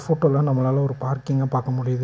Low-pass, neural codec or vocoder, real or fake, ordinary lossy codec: none; none; real; none